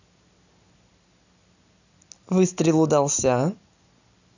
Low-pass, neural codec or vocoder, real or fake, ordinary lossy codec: 7.2 kHz; none; real; none